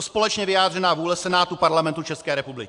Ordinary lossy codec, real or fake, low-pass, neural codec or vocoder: AAC, 64 kbps; real; 10.8 kHz; none